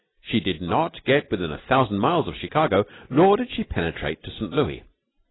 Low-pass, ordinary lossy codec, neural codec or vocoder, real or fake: 7.2 kHz; AAC, 16 kbps; none; real